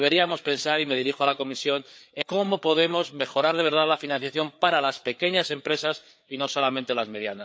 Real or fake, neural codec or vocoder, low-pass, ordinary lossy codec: fake; codec, 16 kHz, 4 kbps, FreqCodec, larger model; none; none